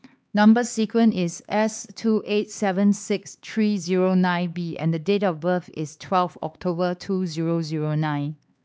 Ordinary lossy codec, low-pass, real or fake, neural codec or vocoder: none; none; fake; codec, 16 kHz, 4 kbps, X-Codec, HuBERT features, trained on LibriSpeech